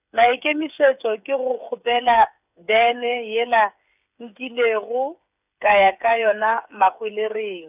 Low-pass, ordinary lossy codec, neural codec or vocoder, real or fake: 3.6 kHz; none; codec, 16 kHz, 8 kbps, FreqCodec, smaller model; fake